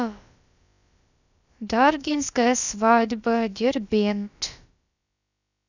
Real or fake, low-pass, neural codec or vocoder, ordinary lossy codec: fake; 7.2 kHz; codec, 16 kHz, about 1 kbps, DyCAST, with the encoder's durations; none